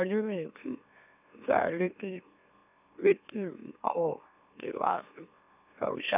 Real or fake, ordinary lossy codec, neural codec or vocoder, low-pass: fake; none; autoencoder, 44.1 kHz, a latent of 192 numbers a frame, MeloTTS; 3.6 kHz